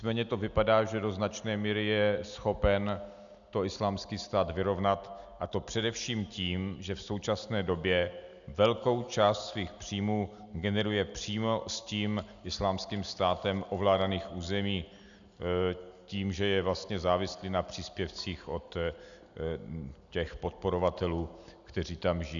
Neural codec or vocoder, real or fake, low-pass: none; real; 7.2 kHz